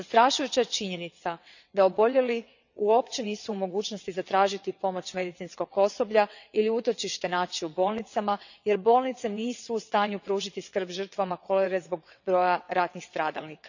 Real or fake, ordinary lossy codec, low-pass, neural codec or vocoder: fake; none; 7.2 kHz; vocoder, 22.05 kHz, 80 mel bands, WaveNeXt